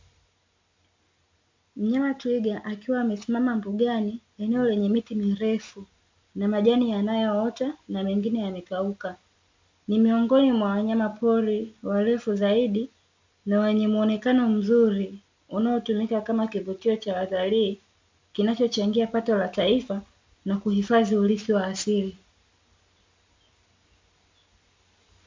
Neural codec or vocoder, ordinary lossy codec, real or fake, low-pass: none; MP3, 64 kbps; real; 7.2 kHz